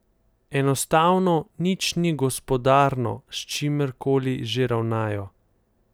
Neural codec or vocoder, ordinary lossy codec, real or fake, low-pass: none; none; real; none